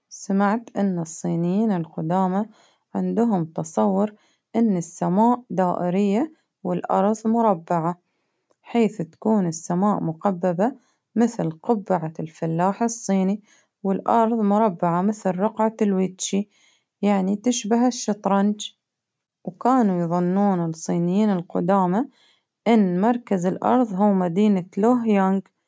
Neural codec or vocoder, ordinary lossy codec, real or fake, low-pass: none; none; real; none